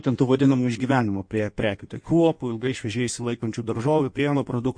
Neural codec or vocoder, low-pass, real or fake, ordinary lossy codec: codec, 16 kHz in and 24 kHz out, 1.1 kbps, FireRedTTS-2 codec; 9.9 kHz; fake; MP3, 48 kbps